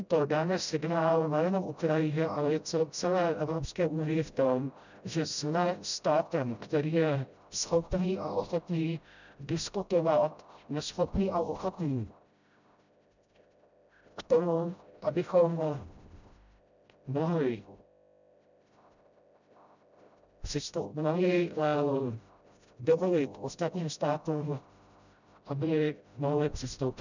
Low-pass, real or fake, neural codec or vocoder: 7.2 kHz; fake; codec, 16 kHz, 0.5 kbps, FreqCodec, smaller model